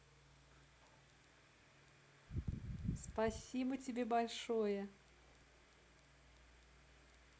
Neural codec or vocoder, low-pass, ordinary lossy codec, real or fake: none; none; none; real